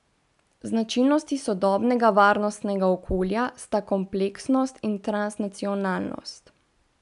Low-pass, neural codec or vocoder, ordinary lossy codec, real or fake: 10.8 kHz; none; none; real